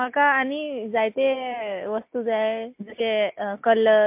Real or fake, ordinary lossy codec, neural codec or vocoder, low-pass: real; MP3, 32 kbps; none; 3.6 kHz